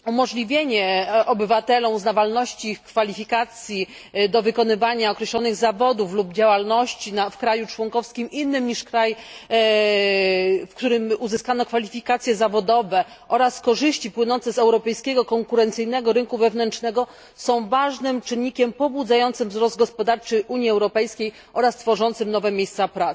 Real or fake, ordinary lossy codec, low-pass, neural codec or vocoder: real; none; none; none